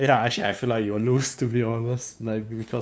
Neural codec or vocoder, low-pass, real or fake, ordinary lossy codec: codec, 16 kHz, 2 kbps, FunCodec, trained on LibriTTS, 25 frames a second; none; fake; none